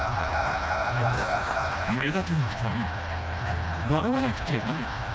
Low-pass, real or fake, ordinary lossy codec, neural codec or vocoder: none; fake; none; codec, 16 kHz, 1 kbps, FreqCodec, smaller model